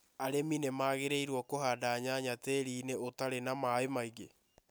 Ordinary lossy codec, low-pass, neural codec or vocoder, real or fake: none; none; none; real